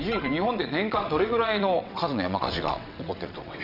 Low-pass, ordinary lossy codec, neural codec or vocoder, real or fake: 5.4 kHz; none; vocoder, 22.05 kHz, 80 mel bands, WaveNeXt; fake